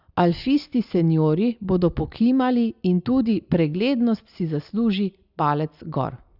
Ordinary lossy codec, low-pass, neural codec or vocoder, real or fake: Opus, 64 kbps; 5.4 kHz; none; real